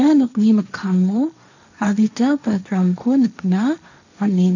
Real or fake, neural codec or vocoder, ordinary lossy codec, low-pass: fake; codec, 16 kHz, 1.1 kbps, Voila-Tokenizer; none; 7.2 kHz